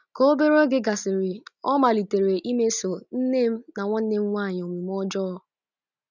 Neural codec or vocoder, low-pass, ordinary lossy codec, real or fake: none; 7.2 kHz; none; real